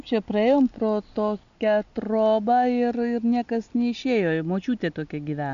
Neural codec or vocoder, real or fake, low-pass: none; real; 7.2 kHz